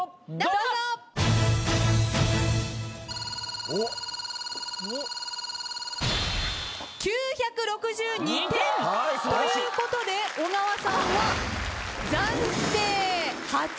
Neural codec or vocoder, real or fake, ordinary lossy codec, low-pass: none; real; none; none